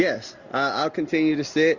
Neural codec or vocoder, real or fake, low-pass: none; real; 7.2 kHz